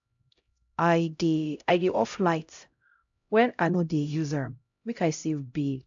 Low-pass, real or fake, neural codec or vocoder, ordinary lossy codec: 7.2 kHz; fake; codec, 16 kHz, 0.5 kbps, X-Codec, HuBERT features, trained on LibriSpeech; AAC, 64 kbps